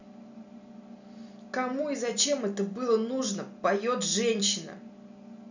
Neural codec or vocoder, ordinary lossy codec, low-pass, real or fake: none; none; 7.2 kHz; real